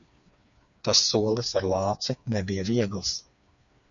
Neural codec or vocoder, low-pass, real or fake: codec, 16 kHz, 4 kbps, FreqCodec, smaller model; 7.2 kHz; fake